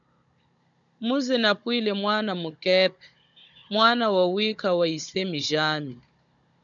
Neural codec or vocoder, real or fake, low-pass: codec, 16 kHz, 16 kbps, FunCodec, trained on Chinese and English, 50 frames a second; fake; 7.2 kHz